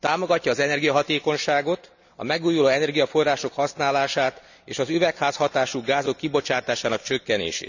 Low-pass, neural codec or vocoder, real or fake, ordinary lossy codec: 7.2 kHz; none; real; none